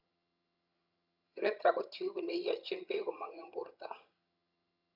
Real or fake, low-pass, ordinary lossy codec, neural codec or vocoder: fake; 5.4 kHz; none; vocoder, 22.05 kHz, 80 mel bands, HiFi-GAN